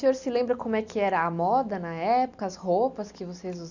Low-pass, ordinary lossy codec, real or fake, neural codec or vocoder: 7.2 kHz; none; real; none